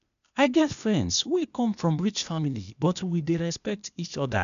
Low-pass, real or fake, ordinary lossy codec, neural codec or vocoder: 7.2 kHz; fake; none; codec, 16 kHz, 0.8 kbps, ZipCodec